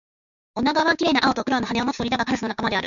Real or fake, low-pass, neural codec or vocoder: fake; 7.2 kHz; codec, 16 kHz, 6 kbps, DAC